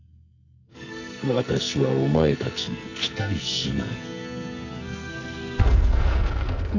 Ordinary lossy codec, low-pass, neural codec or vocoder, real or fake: none; 7.2 kHz; codec, 44.1 kHz, 2.6 kbps, SNAC; fake